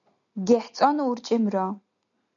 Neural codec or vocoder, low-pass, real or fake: none; 7.2 kHz; real